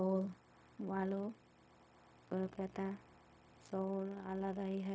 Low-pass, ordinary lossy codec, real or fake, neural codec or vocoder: none; none; fake; codec, 16 kHz, 0.4 kbps, LongCat-Audio-Codec